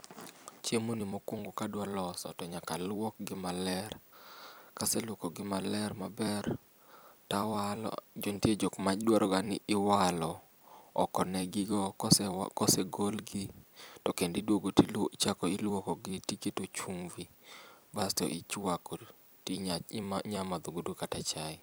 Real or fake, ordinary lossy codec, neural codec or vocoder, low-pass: fake; none; vocoder, 44.1 kHz, 128 mel bands every 256 samples, BigVGAN v2; none